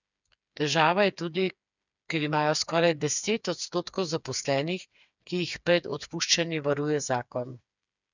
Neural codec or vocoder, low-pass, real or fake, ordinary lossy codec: codec, 16 kHz, 4 kbps, FreqCodec, smaller model; 7.2 kHz; fake; none